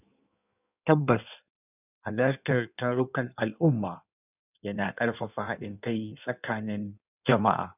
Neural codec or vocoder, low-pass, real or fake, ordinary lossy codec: codec, 16 kHz in and 24 kHz out, 1.1 kbps, FireRedTTS-2 codec; 3.6 kHz; fake; none